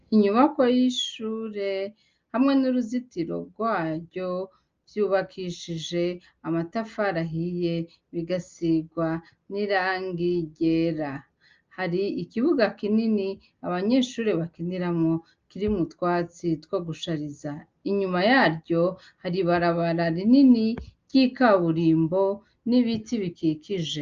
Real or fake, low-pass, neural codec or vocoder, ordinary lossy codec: real; 7.2 kHz; none; Opus, 24 kbps